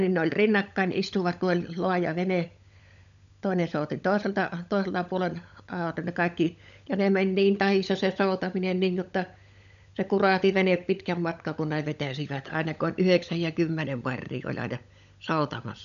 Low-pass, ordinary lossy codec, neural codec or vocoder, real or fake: 7.2 kHz; none; codec, 16 kHz, 16 kbps, FunCodec, trained on LibriTTS, 50 frames a second; fake